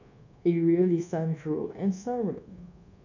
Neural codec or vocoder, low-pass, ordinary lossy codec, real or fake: codec, 24 kHz, 1.2 kbps, DualCodec; 7.2 kHz; none; fake